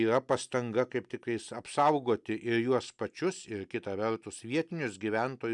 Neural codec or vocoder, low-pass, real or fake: none; 10.8 kHz; real